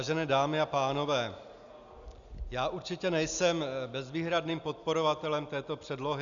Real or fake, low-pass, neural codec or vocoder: real; 7.2 kHz; none